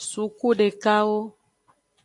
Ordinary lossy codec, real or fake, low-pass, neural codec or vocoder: AAC, 64 kbps; real; 10.8 kHz; none